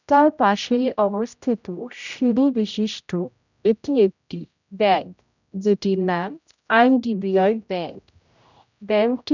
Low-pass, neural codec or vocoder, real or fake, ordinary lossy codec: 7.2 kHz; codec, 16 kHz, 0.5 kbps, X-Codec, HuBERT features, trained on general audio; fake; none